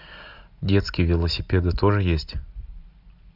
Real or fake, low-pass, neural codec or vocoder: real; 5.4 kHz; none